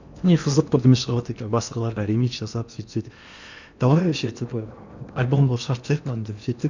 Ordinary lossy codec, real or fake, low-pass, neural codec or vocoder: none; fake; 7.2 kHz; codec, 16 kHz in and 24 kHz out, 0.8 kbps, FocalCodec, streaming, 65536 codes